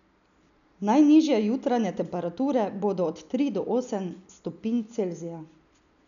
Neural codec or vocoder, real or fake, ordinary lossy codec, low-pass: none; real; none; 7.2 kHz